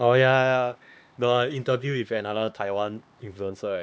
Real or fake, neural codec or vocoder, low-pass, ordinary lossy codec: fake; codec, 16 kHz, 2 kbps, X-Codec, HuBERT features, trained on LibriSpeech; none; none